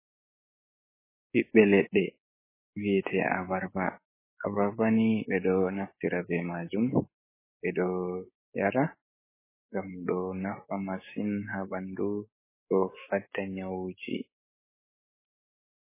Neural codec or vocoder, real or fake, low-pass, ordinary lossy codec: codec, 16 kHz, 6 kbps, DAC; fake; 3.6 kHz; MP3, 16 kbps